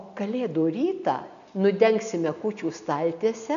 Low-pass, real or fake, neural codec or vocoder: 7.2 kHz; real; none